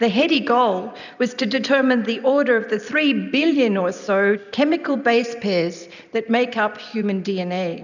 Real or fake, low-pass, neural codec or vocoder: real; 7.2 kHz; none